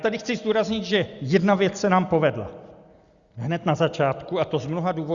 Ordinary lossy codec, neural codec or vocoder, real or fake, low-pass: Opus, 64 kbps; none; real; 7.2 kHz